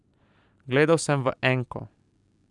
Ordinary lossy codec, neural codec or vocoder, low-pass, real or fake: none; vocoder, 44.1 kHz, 128 mel bands every 512 samples, BigVGAN v2; 10.8 kHz; fake